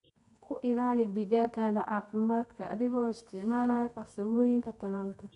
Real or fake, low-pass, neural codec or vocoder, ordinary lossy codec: fake; 10.8 kHz; codec, 24 kHz, 0.9 kbps, WavTokenizer, medium music audio release; none